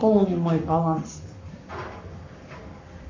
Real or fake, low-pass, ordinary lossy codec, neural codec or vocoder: fake; 7.2 kHz; MP3, 64 kbps; codec, 44.1 kHz, 7.8 kbps, Pupu-Codec